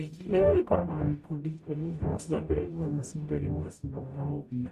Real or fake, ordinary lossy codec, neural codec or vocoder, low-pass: fake; Opus, 64 kbps; codec, 44.1 kHz, 0.9 kbps, DAC; 14.4 kHz